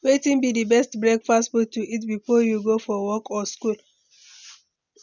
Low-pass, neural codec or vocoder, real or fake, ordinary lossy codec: 7.2 kHz; none; real; none